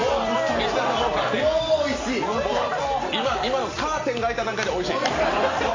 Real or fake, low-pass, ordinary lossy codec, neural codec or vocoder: real; 7.2 kHz; AAC, 32 kbps; none